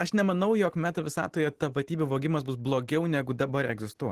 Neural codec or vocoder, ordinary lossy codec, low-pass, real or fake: none; Opus, 16 kbps; 14.4 kHz; real